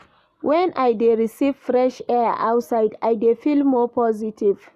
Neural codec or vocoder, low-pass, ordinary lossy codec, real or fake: none; 14.4 kHz; none; real